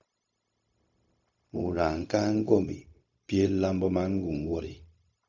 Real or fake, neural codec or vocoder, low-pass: fake; codec, 16 kHz, 0.4 kbps, LongCat-Audio-Codec; 7.2 kHz